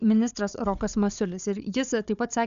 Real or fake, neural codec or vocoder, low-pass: fake; codec, 16 kHz, 4 kbps, FunCodec, trained on Chinese and English, 50 frames a second; 7.2 kHz